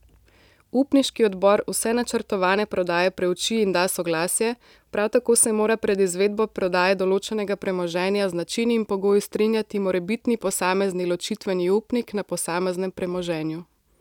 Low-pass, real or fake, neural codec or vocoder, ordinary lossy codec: 19.8 kHz; real; none; none